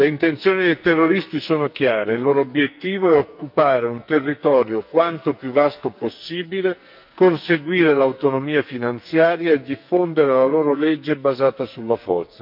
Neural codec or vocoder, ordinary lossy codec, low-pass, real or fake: codec, 44.1 kHz, 2.6 kbps, SNAC; none; 5.4 kHz; fake